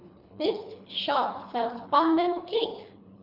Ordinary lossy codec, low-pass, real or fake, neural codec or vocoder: none; 5.4 kHz; fake; codec, 24 kHz, 3 kbps, HILCodec